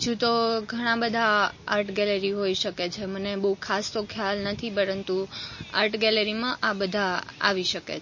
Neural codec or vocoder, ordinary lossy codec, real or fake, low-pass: none; MP3, 32 kbps; real; 7.2 kHz